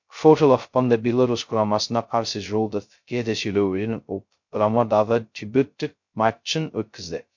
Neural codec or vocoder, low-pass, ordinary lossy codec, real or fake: codec, 16 kHz, 0.2 kbps, FocalCodec; 7.2 kHz; MP3, 48 kbps; fake